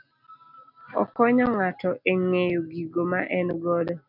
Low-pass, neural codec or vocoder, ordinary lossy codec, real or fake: 5.4 kHz; none; MP3, 48 kbps; real